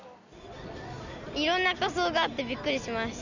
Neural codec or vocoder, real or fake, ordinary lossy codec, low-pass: none; real; none; 7.2 kHz